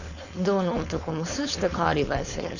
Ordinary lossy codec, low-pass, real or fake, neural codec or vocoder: none; 7.2 kHz; fake; codec, 16 kHz, 4.8 kbps, FACodec